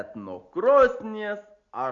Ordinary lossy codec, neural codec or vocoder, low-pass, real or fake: Opus, 64 kbps; none; 7.2 kHz; real